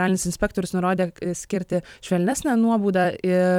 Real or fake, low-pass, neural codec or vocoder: fake; 19.8 kHz; vocoder, 44.1 kHz, 128 mel bands every 256 samples, BigVGAN v2